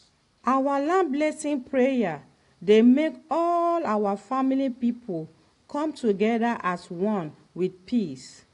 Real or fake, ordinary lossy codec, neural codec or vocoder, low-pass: real; AAC, 48 kbps; none; 10.8 kHz